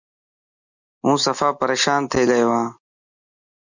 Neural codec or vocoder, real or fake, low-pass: none; real; 7.2 kHz